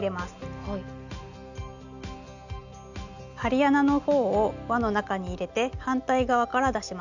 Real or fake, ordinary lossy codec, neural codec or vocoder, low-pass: real; none; none; 7.2 kHz